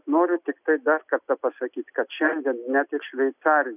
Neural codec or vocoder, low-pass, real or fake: none; 3.6 kHz; real